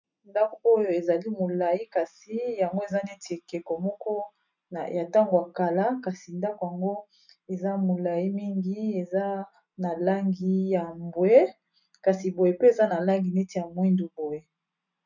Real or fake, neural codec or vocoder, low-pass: real; none; 7.2 kHz